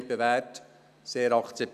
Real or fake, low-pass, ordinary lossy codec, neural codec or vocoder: real; 14.4 kHz; none; none